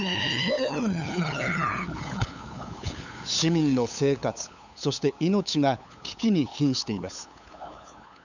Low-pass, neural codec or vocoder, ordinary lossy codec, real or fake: 7.2 kHz; codec, 16 kHz, 8 kbps, FunCodec, trained on LibriTTS, 25 frames a second; none; fake